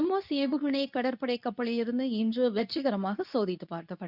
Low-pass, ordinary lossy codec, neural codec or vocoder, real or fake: 5.4 kHz; none; codec, 24 kHz, 0.9 kbps, WavTokenizer, medium speech release version 2; fake